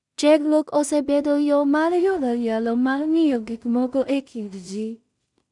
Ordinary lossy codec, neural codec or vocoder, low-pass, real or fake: none; codec, 16 kHz in and 24 kHz out, 0.4 kbps, LongCat-Audio-Codec, two codebook decoder; 10.8 kHz; fake